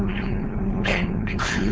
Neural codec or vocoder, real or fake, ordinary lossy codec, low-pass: codec, 16 kHz, 4.8 kbps, FACodec; fake; none; none